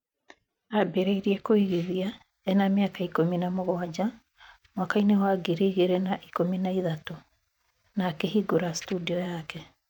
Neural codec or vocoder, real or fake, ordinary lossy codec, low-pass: vocoder, 44.1 kHz, 128 mel bands every 512 samples, BigVGAN v2; fake; none; 19.8 kHz